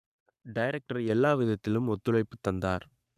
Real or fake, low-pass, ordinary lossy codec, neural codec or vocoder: fake; 14.4 kHz; none; codec, 44.1 kHz, 7.8 kbps, Pupu-Codec